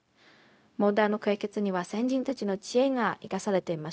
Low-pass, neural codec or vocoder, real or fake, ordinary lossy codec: none; codec, 16 kHz, 0.4 kbps, LongCat-Audio-Codec; fake; none